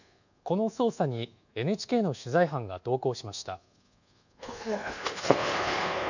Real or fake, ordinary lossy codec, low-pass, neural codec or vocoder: fake; none; 7.2 kHz; codec, 24 kHz, 1.2 kbps, DualCodec